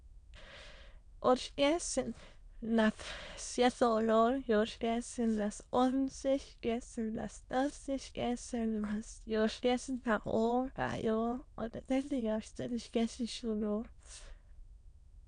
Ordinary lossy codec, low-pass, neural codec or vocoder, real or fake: none; 9.9 kHz; autoencoder, 22.05 kHz, a latent of 192 numbers a frame, VITS, trained on many speakers; fake